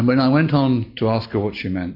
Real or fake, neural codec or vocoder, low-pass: real; none; 5.4 kHz